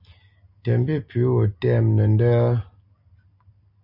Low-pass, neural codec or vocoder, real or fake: 5.4 kHz; none; real